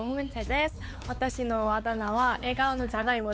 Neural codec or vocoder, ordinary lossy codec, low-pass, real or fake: codec, 16 kHz, 4 kbps, X-Codec, WavLM features, trained on Multilingual LibriSpeech; none; none; fake